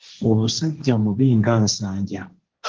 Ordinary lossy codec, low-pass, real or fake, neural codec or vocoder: Opus, 16 kbps; 7.2 kHz; fake; codec, 16 kHz, 1.1 kbps, Voila-Tokenizer